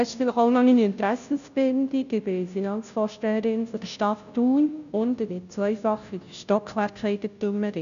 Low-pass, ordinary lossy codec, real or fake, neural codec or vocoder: 7.2 kHz; none; fake; codec, 16 kHz, 0.5 kbps, FunCodec, trained on Chinese and English, 25 frames a second